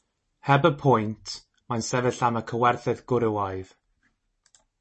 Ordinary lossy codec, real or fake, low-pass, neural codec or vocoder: MP3, 32 kbps; real; 10.8 kHz; none